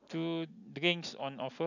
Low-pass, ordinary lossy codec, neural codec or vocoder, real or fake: 7.2 kHz; none; none; real